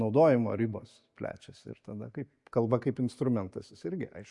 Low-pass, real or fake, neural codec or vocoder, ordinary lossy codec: 10.8 kHz; real; none; MP3, 64 kbps